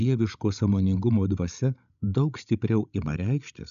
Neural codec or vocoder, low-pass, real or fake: codec, 16 kHz, 16 kbps, FreqCodec, larger model; 7.2 kHz; fake